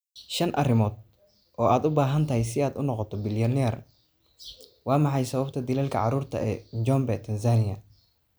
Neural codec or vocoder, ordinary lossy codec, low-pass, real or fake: none; none; none; real